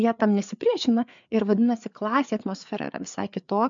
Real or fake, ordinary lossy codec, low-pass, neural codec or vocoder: fake; MP3, 64 kbps; 7.2 kHz; codec, 16 kHz, 4 kbps, FreqCodec, larger model